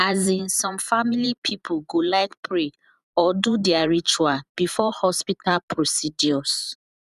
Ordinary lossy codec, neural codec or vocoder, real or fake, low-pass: none; vocoder, 44.1 kHz, 128 mel bands every 512 samples, BigVGAN v2; fake; 14.4 kHz